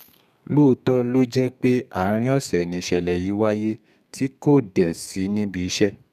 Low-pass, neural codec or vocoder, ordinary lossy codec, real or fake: 14.4 kHz; codec, 32 kHz, 1.9 kbps, SNAC; none; fake